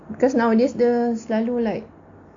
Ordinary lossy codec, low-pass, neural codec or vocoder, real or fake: AAC, 48 kbps; 7.2 kHz; none; real